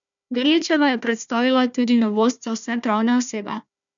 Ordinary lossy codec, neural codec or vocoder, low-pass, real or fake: none; codec, 16 kHz, 1 kbps, FunCodec, trained on Chinese and English, 50 frames a second; 7.2 kHz; fake